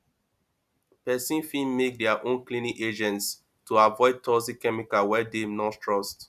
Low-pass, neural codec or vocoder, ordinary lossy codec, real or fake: 14.4 kHz; none; none; real